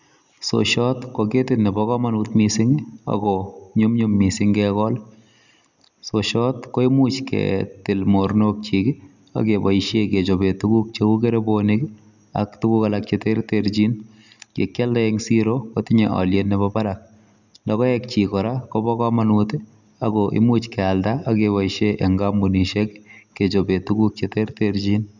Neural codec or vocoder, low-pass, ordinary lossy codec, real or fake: none; 7.2 kHz; none; real